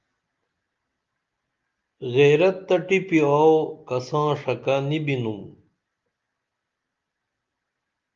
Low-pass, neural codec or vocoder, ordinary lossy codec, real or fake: 7.2 kHz; none; Opus, 32 kbps; real